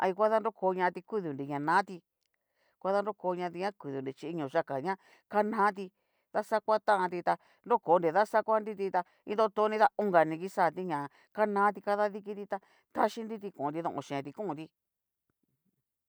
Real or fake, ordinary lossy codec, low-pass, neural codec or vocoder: real; none; none; none